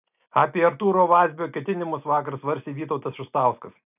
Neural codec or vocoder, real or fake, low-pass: none; real; 3.6 kHz